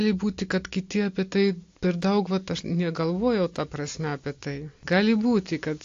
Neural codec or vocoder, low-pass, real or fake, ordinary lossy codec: none; 7.2 kHz; real; AAC, 48 kbps